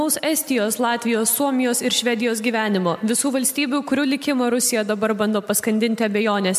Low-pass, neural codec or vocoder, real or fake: 14.4 kHz; none; real